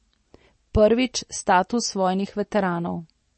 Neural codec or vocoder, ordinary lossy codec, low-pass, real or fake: none; MP3, 32 kbps; 10.8 kHz; real